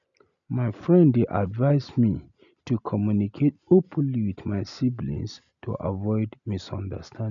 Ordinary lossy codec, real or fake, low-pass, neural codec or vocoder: none; real; 7.2 kHz; none